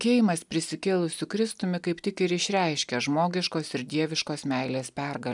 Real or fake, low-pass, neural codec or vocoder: real; 10.8 kHz; none